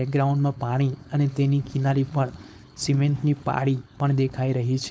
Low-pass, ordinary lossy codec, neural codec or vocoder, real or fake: none; none; codec, 16 kHz, 4.8 kbps, FACodec; fake